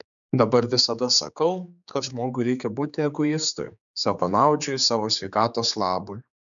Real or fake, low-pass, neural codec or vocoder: fake; 7.2 kHz; codec, 16 kHz, 4 kbps, X-Codec, HuBERT features, trained on general audio